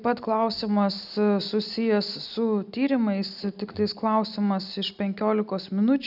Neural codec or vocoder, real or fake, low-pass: none; real; 5.4 kHz